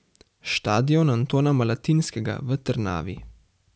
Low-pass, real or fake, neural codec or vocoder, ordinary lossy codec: none; real; none; none